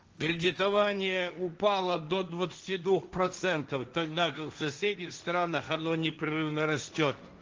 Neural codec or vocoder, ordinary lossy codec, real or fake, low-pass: codec, 16 kHz, 1.1 kbps, Voila-Tokenizer; Opus, 24 kbps; fake; 7.2 kHz